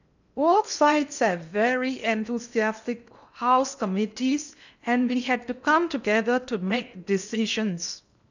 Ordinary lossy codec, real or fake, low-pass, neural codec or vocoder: none; fake; 7.2 kHz; codec, 16 kHz in and 24 kHz out, 0.8 kbps, FocalCodec, streaming, 65536 codes